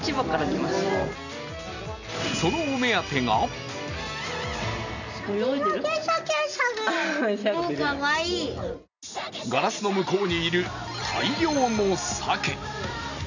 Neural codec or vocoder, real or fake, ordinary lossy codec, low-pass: none; real; none; 7.2 kHz